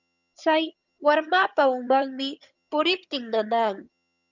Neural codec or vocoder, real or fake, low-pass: vocoder, 22.05 kHz, 80 mel bands, HiFi-GAN; fake; 7.2 kHz